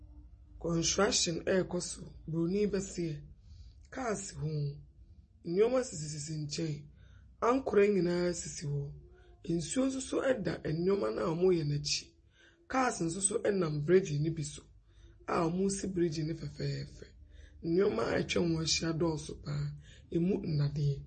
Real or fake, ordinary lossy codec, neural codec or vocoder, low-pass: real; MP3, 32 kbps; none; 10.8 kHz